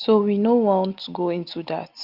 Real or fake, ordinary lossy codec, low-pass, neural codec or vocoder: real; Opus, 24 kbps; 5.4 kHz; none